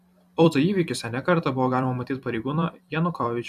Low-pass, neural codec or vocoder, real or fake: 14.4 kHz; vocoder, 44.1 kHz, 128 mel bands every 256 samples, BigVGAN v2; fake